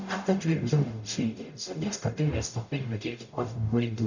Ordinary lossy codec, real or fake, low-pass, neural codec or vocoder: none; fake; 7.2 kHz; codec, 44.1 kHz, 0.9 kbps, DAC